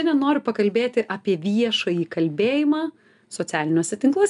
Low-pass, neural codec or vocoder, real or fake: 10.8 kHz; none; real